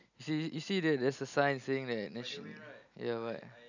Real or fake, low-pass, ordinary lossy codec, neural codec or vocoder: real; 7.2 kHz; none; none